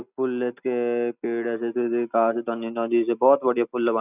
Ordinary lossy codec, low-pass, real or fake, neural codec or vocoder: none; 3.6 kHz; real; none